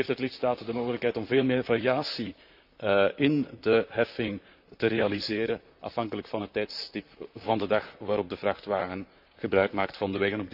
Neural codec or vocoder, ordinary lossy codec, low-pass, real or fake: vocoder, 44.1 kHz, 128 mel bands, Pupu-Vocoder; none; 5.4 kHz; fake